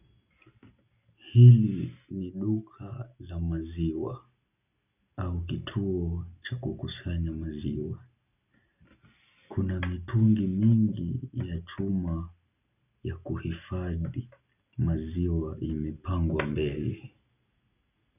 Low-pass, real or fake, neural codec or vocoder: 3.6 kHz; real; none